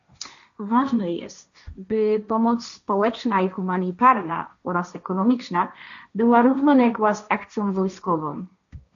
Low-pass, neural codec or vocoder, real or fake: 7.2 kHz; codec, 16 kHz, 1.1 kbps, Voila-Tokenizer; fake